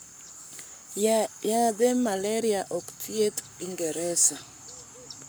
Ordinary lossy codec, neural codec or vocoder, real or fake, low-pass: none; codec, 44.1 kHz, 7.8 kbps, Pupu-Codec; fake; none